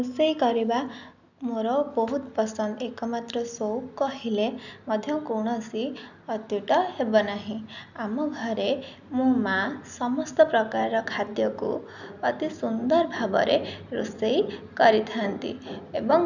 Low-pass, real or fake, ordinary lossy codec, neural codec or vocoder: 7.2 kHz; real; none; none